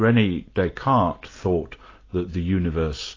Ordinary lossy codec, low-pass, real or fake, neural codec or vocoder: AAC, 32 kbps; 7.2 kHz; real; none